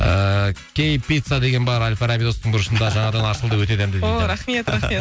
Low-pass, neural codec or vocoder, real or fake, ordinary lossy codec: none; none; real; none